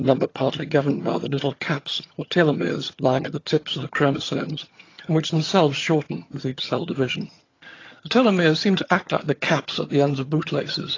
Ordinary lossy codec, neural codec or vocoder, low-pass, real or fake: AAC, 32 kbps; vocoder, 22.05 kHz, 80 mel bands, HiFi-GAN; 7.2 kHz; fake